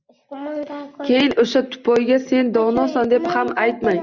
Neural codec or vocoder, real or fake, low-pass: none; real; 7.2 kHz